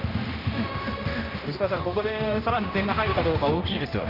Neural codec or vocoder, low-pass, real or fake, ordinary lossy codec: codec, 16 kHz, 1 kbps, X-Codec, HuBERT features, trained on general audio; 5.4 kHz; fake; none